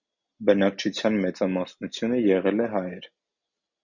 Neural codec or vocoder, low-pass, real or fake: none; 7.2 kHz; real